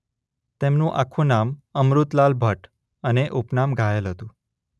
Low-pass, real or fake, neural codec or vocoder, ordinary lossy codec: none; real; none; none